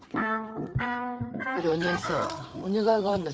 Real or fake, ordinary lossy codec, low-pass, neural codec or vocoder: fake; none; none; codec, 16 kHz, 4 kbps, FreqCodec, larger model